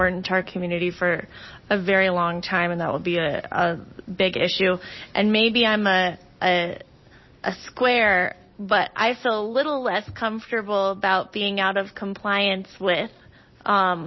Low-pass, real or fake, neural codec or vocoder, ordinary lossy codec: 7.2 kHz; real; none; MP3, 24 kbps